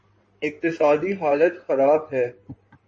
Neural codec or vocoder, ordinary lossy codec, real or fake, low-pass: codec, 16 kHz in and 24 kHz out, 2.2 kbps, FireRedTTS-2 codec; MP3, 32 kbps; fake; 9.9 kHz